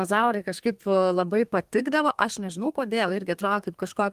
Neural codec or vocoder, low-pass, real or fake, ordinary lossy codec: codec, 32 kHz, 1.9 kbps, SNAC; 14.4 kHz; fake; Opus, 24 kbps